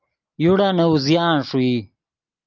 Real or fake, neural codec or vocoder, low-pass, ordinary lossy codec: real; none; 7.2 kHz; Opus, 32 kbps